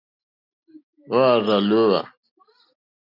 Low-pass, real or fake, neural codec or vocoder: 5.4 kHz; real; none